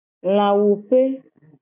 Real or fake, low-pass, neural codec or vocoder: real; 3.6 kHz; none